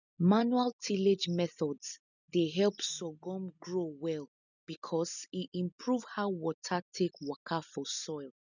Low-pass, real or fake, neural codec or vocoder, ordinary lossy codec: 7.2 kHz; real; none; none